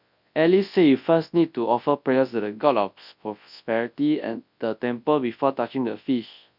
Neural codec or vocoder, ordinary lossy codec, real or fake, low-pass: codec, 24 kHz, 0.9 kbps, WavTokenizer, large speech release; none; fake; 5.4 kHz